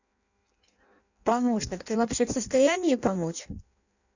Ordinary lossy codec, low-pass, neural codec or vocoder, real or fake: none; 7.2 kHz; codec, 16 kHz in and 24 kHz out, 0.6 kbps, FireRedTTS-2 codec; fake